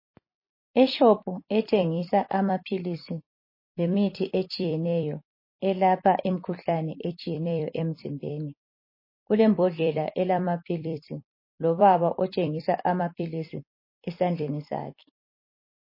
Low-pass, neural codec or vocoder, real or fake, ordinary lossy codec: 5.4 kHz; vocoder, 44.1 kHz, 128 mel bands every 256 samples, BigVGAN v2; fake; MP3, 24 kbps